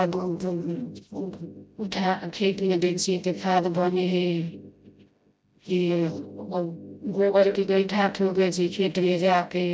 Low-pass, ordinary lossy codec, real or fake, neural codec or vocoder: none; none; fake; codec, 16 kHz, 0.5 kbps, FreqCodec, smaller model